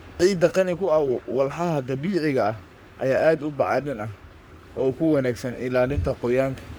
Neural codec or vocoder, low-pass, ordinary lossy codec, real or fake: codec, 44.1 kHz, 3.4 kbps, Pupu-Codec; none; none; fake